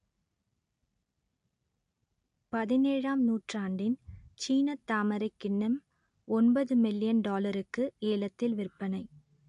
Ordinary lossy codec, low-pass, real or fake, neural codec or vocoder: AAC, 64 kbps; 10.8 kHz; real; none